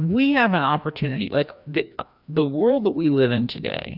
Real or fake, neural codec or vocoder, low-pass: fake; codec, 16 kHz, 1 kbps, FreqCodec, larger model; 5.4 kHz